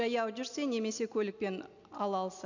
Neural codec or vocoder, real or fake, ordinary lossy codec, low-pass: none; real; none; 7.2 kHz